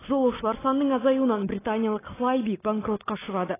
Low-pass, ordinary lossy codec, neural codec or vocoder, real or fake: 3.6 kHz; AAC, 16 kbps; none; real